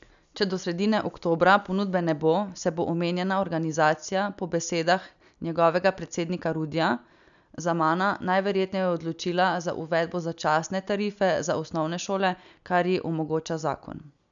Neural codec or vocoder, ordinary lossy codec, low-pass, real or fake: none; none; 7.2 kHz; real